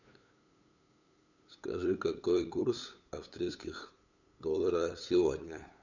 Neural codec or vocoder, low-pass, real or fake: codec, 16 kHz, 8 kbps, FunCodec, trained on LibriTTS, 25 frames a second; 7.2 kHz; fake